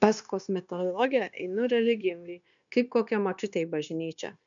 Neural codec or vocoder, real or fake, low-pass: codec, 16 kHz, 0.9 kbps, LongCat-Audio-Codec; fake; 7.2 kHz